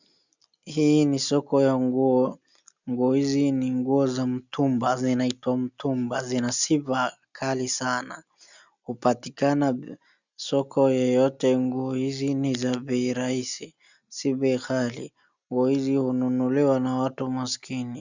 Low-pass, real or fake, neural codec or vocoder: 7.2 kHz; real; none